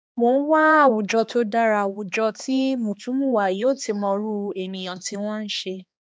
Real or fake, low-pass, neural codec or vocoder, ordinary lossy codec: fake; none; codec, 16 kHz, 2 kbps, X-Codec, HuBERT features, trained on balanced general audio; none